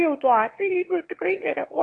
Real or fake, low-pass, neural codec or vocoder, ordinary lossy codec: fake; 9.9 kHz; autoencoder, 22.05 kHz, a latent of 192 numbers a frame, VITS, trained on one speaker; AAC, 48 kbps